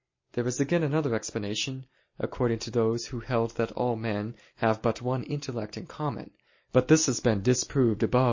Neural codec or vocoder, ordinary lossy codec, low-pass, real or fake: none; MP3, 32 kbps; 7.2 kHz; real